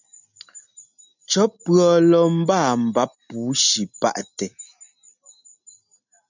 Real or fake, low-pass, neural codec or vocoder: real; 7.2 kHz; none